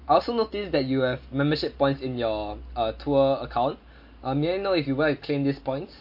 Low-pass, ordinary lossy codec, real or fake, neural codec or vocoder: 5.4 kHz; MP3, 32 kbps; real; none